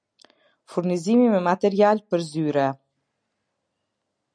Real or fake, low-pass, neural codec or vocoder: real; 9.9 kHz; none